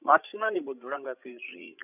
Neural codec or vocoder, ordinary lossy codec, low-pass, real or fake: codec, 16 kHz, 16 kbps, FreqCodec, larger model; none; 3.6 kHz; fake